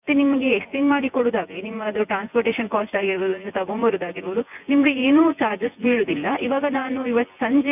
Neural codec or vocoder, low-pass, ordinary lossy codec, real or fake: vocoder, 24 kHz, 100 mel bands, Vocos; 3.6 kHz; none; fake